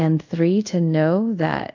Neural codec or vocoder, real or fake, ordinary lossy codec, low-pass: codec, 24 kHz, 0.5 kbps, DualCodec; fake; AAC, 48 kbps; 7.2 kHz